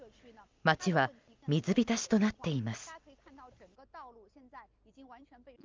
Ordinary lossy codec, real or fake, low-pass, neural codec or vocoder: Opus, 24 kbps; real; 7.2 kHz; none